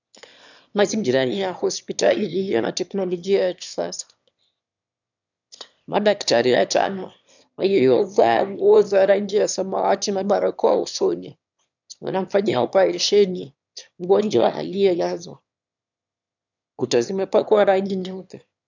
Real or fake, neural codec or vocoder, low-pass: fake; autoencoder, 22.05 kHz, a latent of 192 numbers a frame, VITS, trained on one speaker; 7.2 kHz